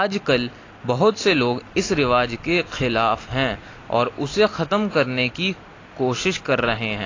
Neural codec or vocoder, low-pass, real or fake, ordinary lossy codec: none; 7.2 kHz; real; AAC, 32 kbps